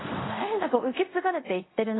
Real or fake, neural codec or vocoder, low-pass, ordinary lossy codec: fake; codec, 24 kHz, 0.5 kbps, DualCodec; 7.2 kHz; AAC, 16 kbps